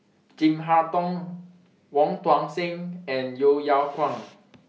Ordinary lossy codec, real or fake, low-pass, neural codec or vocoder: none; real; none; none